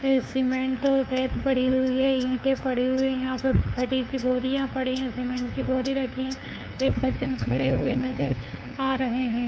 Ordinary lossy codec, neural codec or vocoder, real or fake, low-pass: none; codec, 16 kHz, 2 kbps, FunCodec, trained on LibriTTS, 25 frames a second; fake; none